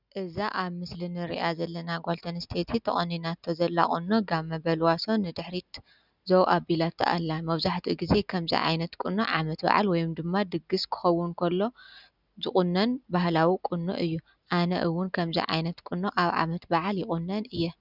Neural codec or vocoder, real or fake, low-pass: none; real; 5.4 kHz